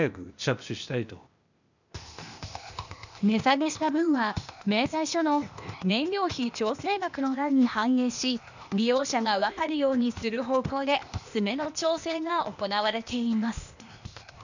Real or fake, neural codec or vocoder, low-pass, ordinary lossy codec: fake; codec, 16 kHz, 0.8 kbps, ZipCodec; 7.2 kHz; none